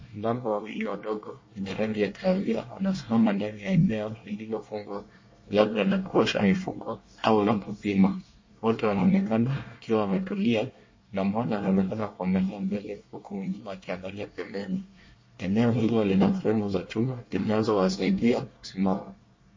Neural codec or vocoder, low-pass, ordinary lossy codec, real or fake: codec, 24 kHz, 1 kbps, SNAC; 7.2 kHz; MP3, 32 kbps; fake